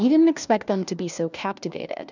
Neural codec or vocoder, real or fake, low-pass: codec, 16 kHz, 1 kbps, FunCodec, trained on LibriTTS, 50 frames a second; fake; 7.2 kHz